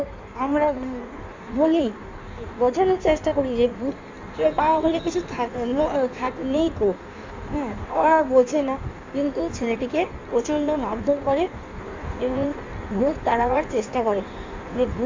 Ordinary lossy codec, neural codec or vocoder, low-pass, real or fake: MP3, 64 kbps; codec, 16 kHz in and 24 kHz out, 1.1 kbps, FireRedTTS-2 codec; 7.2 kHz; fake